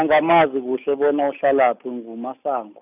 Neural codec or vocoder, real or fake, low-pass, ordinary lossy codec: none; real; 3.6 kHz; none